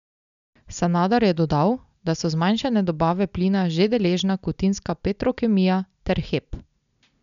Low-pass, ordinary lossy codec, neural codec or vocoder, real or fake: 7.2 kHz; none; none; real